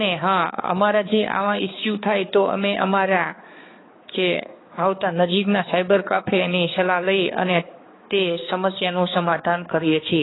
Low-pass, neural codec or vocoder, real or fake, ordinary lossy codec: 7.2 kHz; codec, 16 kHz, 4 kbps, X-Codec, HuBERT features, trained on balanced general audio; fake; AAC, 16 kbps